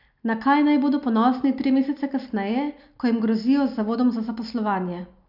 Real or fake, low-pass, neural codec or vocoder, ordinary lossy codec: real; 5.4 kHz; none; none